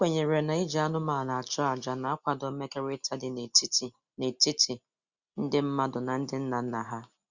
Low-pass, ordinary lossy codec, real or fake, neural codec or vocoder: 7.2 kHz; Opus, 64 kbps; real; none